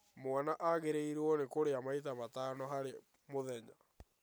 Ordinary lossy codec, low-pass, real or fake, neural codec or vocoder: none; none; real; none